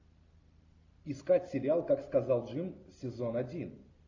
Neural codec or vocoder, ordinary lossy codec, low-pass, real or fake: none; AAC, 48 kbps; 7.2 kHz; real